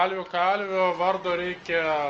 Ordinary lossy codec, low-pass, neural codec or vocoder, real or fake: Opus, 24 kbps; 7.2 kHz; none; real